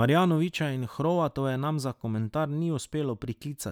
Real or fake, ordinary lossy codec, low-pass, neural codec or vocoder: real; none; 19.8 kHz; none